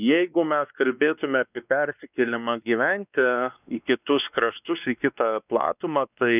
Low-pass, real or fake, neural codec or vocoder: 3.6 kHz; fake; codec, 16 kHz, 2 kbps, X-Codec, WavLM features, trained on Multilingual LibriSpeech